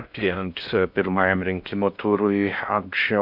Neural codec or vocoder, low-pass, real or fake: codec, 16 kHz in and 24 kHz out, 0.6 kbps, FocalCodec, streaming, 4096 codes; 5.4 kHz; fake